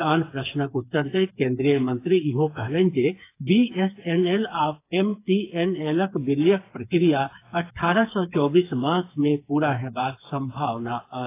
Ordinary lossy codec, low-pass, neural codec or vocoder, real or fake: AAC, 24 kbps; 3.6 kHz; codec, 16 kHz, 4 kbps, FreqCodec, smaller model; fake